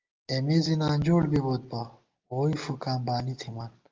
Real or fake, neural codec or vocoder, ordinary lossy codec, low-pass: real; none; Opus, 32 kbps; 7.2 kHz